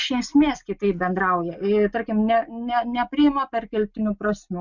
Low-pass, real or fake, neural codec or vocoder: 7.2 kHz; real; none